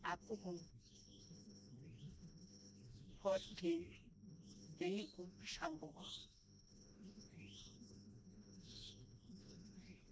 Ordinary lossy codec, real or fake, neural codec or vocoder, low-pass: none; fake; codec, 16 kHz, 1 kbps, FreqCodec, smaller model; none